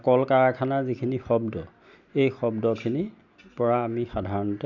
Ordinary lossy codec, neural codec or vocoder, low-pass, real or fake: none; none; 7.2 kHz; real